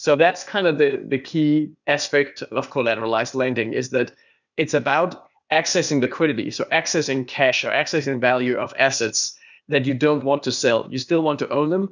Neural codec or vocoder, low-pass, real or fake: codec, 16 kHz, 0.8 kbps, ZipCodec; 7.2 kHz; fake